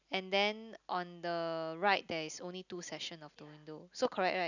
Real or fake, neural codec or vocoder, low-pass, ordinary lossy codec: real; none; 7.2 kHz; none